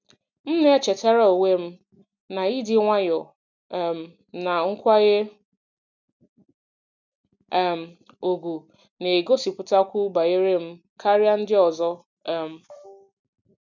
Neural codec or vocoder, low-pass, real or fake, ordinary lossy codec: none; 7.2 kHz; real; none